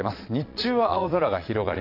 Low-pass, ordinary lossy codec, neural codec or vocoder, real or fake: 5.4 kHz; AAC, 32 kbps; vocoder, 22.05 kHz, 80 mel bands, Vocos; fake